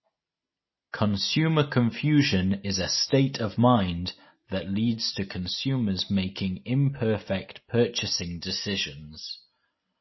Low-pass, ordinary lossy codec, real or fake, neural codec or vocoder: 7.2 kHz; MP3, 24 kbps; real; none